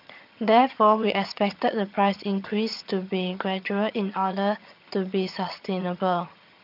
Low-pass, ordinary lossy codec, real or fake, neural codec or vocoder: 5.4 kHz; AAC, 48 kbps; fake; vocoder, 22.05 kHz, 80 mel bands, HiFi-GAN